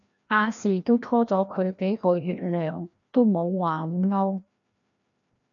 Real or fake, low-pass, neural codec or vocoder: fake; 7.2 kHz; codec, 16 kHz, 1 kbps, FreqCodec, larger model